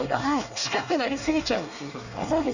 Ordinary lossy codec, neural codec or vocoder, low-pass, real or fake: none; codec, 24 kHz, 1 kbps, SNAC; 7.2 kHz; fake